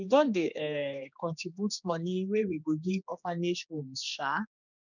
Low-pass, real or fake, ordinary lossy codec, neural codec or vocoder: 7.2 kHz; fake; none; codec, 16 kHz, 2 kbps, X-Codec, HuBERT features, trained on general audio